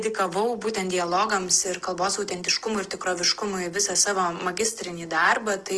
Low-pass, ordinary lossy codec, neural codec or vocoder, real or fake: 10.8 kHz; Opus, 16 kbps; none; real